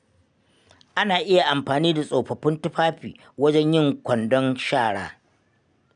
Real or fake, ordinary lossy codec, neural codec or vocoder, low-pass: real; none; none; 9.9 kHz